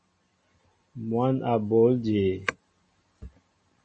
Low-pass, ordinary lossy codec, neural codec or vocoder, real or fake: 10.8 kHz; MP3, 32 kbps; none; real